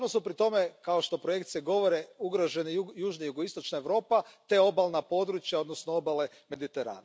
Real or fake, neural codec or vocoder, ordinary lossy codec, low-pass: real; none; none; none